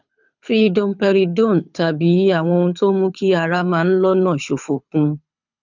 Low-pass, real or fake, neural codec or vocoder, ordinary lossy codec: 7.2 kHz; fake; codec, 24 kHz, 6 kbps, HILCodec; none